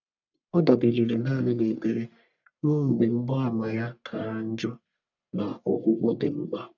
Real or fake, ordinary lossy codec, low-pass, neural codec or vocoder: fake; none; 7.2 kHz; codec, 44.1 kHz, 1.7 kbps, Pupu-Codec